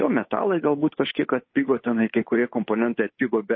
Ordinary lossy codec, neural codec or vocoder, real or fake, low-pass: MP3, 24 kbps; codec, 16 kHz, 8 kbps, FunCodec, trained on Chinese and English, 25 frames a second; fake; 7.2 kHz